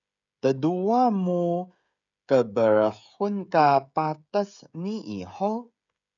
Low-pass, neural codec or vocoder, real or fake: 7.2 kHz; codec, 16 kHz, 16 kbps, FreqCodec, smaller model; fake